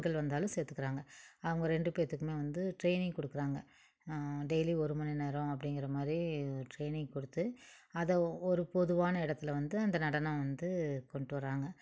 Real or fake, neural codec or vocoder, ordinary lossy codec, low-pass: real; none; none; none